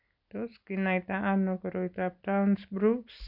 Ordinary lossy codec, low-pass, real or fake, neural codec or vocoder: none; 5.4 kHz; real; none